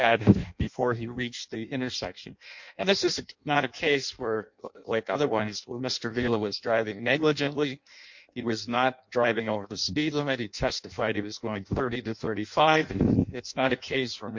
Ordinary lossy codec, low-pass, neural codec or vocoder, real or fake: MP3, 48 kbps; 7.2 kHz; codec, 16 kHz in and 24 kHz out, 0.6 kbps, FireRedTTS-2 codec; fake